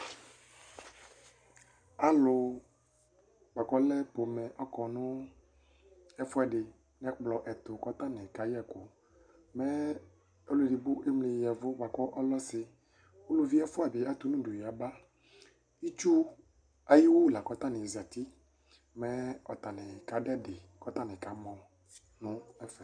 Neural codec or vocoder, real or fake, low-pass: none; real; 9.9 kHz